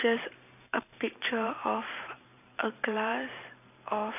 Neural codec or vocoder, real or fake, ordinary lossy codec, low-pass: vocoder, 44.1 kHz, 128 mel bands every 512 samples, BigVGAN v2; fake; none; 3.6 kHz